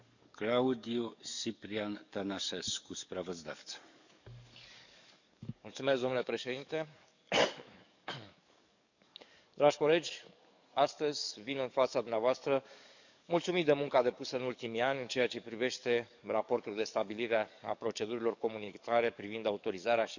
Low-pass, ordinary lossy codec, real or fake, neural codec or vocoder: 7.2 kHz; none; fake; codec, 44.1 kHz, 7.8 kbps, DAC